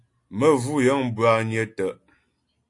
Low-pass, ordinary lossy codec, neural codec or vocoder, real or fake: 10.8 kHz; AAC, 48 kbps; none; real